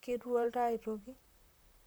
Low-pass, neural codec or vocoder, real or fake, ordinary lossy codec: none; vocoder, 44.1 kHz, 128 mel bands, Pupu-Vocoder; fake; none